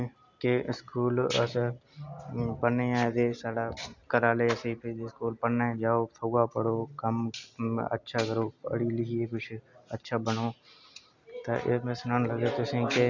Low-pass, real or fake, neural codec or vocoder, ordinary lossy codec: 7.2 kHz; real; none; none